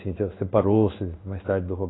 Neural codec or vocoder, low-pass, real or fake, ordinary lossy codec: codec, 16 kHz in and 24 kHz out, 1 kbps, XY-Tokenizer; 7.2 kHz; fake; AAC, 16 kbps